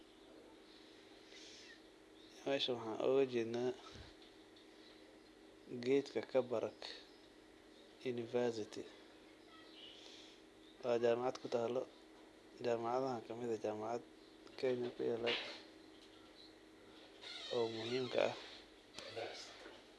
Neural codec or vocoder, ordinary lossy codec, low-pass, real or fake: none; none; none; real